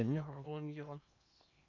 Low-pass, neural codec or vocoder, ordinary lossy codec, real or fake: 7.2 kHz; codec, 16 kHz in and 24 kHz out, 0.8 kbps, FocalCodec, streaming, 65536 codes; none; fake